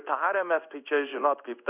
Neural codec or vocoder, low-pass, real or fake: codec, 16 kHz in and 24 kHz out, 1 kbps, XY-Tokenizer; 3.6 kHz; fake